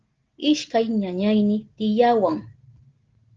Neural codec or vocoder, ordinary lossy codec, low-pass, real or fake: none; Opus, 16 kbps; 7.2 kHz; real